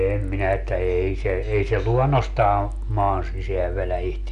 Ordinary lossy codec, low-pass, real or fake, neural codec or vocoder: none; 10.8 kHz; real; none